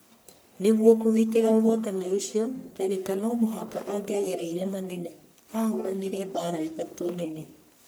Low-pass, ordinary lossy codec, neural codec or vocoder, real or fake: none; none; codec, 44.1 kHz, 1.7 kbps, Pupu-Codec; fake